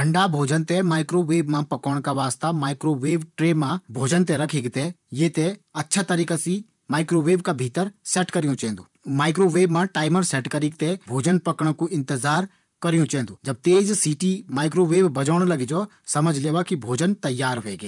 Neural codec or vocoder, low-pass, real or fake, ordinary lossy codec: vocoder, 44.1 kHz, 128 mel bands, Pupu-Vocoder; 10.8 kHz; fake; none